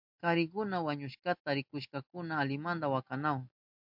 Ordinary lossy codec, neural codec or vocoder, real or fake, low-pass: MP3, 48 kbps; none; real; 5.4 kHz